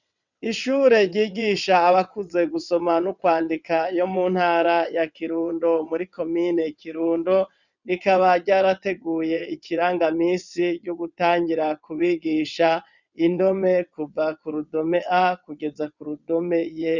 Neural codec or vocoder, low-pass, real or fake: vocoder, 22.05 kHz, 80 mel bands, WaveNeXt; 7.2 kHz; fake